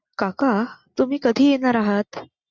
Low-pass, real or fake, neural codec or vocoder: 7.2 kHz; real; none